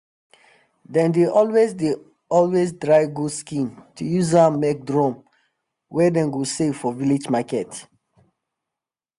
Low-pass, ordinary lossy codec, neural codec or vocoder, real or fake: 10.8 kHz; none; none; real